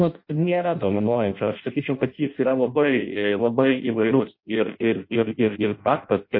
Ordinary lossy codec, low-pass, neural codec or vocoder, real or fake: MP3, 32 kbps; 5.4 kHz; codec, 16 kHz in and 24 kHz out, 0.6 kbps, FireRedTTS-2 codec; fake